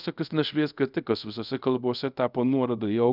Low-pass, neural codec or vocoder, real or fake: 5.4 kHz; codec, 24 kHz, 0.5 kbps, DualCodec; fake